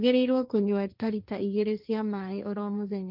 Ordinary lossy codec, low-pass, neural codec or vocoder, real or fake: none; 5.4 kHz; codec, 16 kHz, 1.1 kbps, Voila-Tokenizer; fake